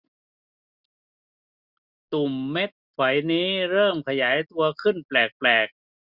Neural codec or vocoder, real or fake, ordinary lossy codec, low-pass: none; real; Opus, 64 kbps; 5.4 kHz